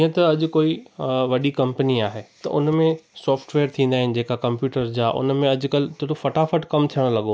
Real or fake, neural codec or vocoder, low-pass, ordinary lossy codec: real; none; none; none